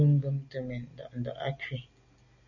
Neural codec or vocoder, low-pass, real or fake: none; 7.2 kHz; real